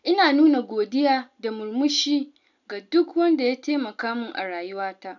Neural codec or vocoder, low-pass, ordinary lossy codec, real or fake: none; 7.2 kHz; none; real